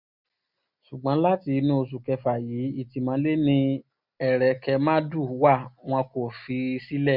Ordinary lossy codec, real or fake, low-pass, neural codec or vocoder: none; real; 5.4 kHz; none